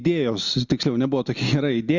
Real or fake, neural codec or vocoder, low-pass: real; none; 7.2 kHz